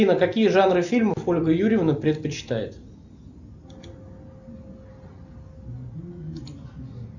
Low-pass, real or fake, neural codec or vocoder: 7.2 kHz; real; none